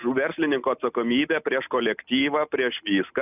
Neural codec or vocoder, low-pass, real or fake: none; 3.6 kHz; real